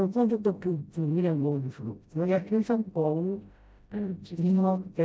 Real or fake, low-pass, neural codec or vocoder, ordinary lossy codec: fake; none; codec, 16 kHz, 0.5 kbps, FreqCodec, smaller model; none